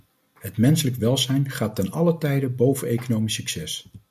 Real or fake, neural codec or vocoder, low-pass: real; none; 14.4 kHz